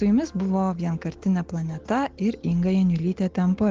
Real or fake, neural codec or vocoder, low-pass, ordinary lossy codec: real; none; 7.2 kHz; Opus, 16 kbps